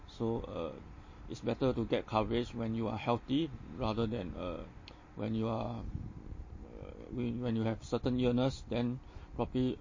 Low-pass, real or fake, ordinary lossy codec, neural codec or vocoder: 7.2 kHz; real; MP3, 32 kbps; none